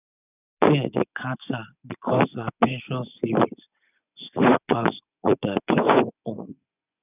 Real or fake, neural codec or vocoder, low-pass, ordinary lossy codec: fake; vocoder, 24 kHz, 100 mel bands, Vocos; 3.6 kHz; none